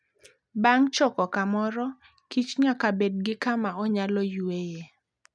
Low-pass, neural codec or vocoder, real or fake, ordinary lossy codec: none; none; real; none